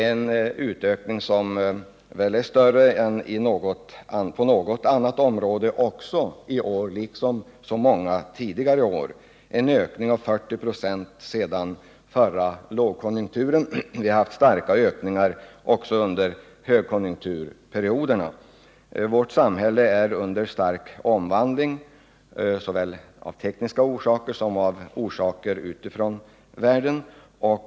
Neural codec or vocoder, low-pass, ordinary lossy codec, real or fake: none; none; none; real